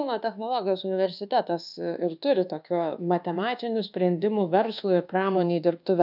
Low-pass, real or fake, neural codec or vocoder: 5.4 kHz; fake; codec, 24 kHz, 1.2 kbps, DualCodec